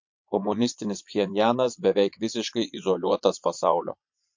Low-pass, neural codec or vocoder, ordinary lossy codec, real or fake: 7.2 kHz; vocoder, 22.05 kHz, 80 mel bands, Vocos; MP3, 48 kbps; fake